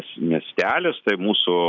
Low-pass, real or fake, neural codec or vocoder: 7.2 kHz; real; none